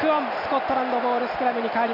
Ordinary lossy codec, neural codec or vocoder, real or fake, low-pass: none; none; real; 5.4 kHz